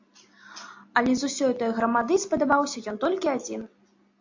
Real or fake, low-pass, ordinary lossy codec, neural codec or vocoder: real; 7.2 kHz; AAC, 48 kbps; none